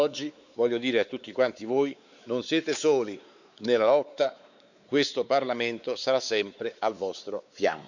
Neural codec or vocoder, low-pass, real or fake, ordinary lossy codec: codec, 16 kHz, 4 kbps, X-Codec, WavLM features, trained on Multilingual LibriSpeech; 7.2 kHz; fake; none